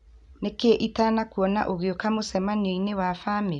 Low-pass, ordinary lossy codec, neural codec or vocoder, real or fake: 10.8 kHz; none; none; real